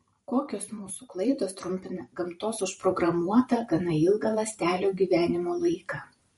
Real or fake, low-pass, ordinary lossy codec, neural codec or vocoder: fake; 19.8 kHz; MP3, 48 kbps; vocoder, 44.1 kHz, 128 mel bands every 512 samples, BigVGAN v2